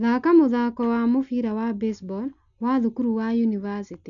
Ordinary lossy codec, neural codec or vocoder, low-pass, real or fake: MP3, 96 kbps; none; 7.2 kHz; real